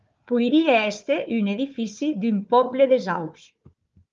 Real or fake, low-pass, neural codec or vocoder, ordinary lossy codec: fake; 7.2 kHz; codec, 16 kHz, 4 kbps, FunCodec, trained on Chinese and English, 50 frames a second; Opus, 32 kbps